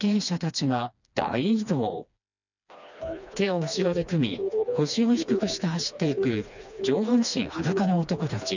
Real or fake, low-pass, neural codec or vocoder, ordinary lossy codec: fake; 7.2 kHz; codec, 16 kHz, 2 kbps, FreqCodec, smaller model; none